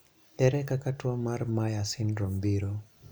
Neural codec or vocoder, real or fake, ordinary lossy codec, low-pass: none; real; none; none